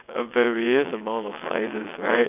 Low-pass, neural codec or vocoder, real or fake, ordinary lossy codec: 3.6 kHz; vocoder, 22.05 kHz, 80 mel bands, WaveNeXt; fake; none